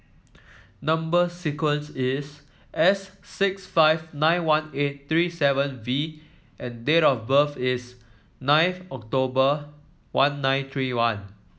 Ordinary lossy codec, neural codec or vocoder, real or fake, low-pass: none; none; real; none